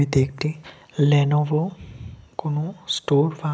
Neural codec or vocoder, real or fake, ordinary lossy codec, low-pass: none; real; none; none